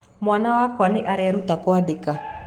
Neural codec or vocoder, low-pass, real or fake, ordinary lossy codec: codec, 44.1 kHz, 7.8 kbps, Pupu-Codec; 19.8 kHz; fake; Opus, 24 kbps